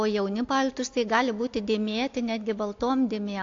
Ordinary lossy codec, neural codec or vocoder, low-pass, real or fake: AAC, 48 kbps; none; 7.2 kHz; real